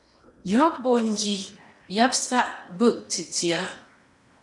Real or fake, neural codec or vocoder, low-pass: fake; codec, 16 kHz in and 24 kHz out, 0.8 kbps, FocalCodec, streaming, 65536 codes; 10.8 kHz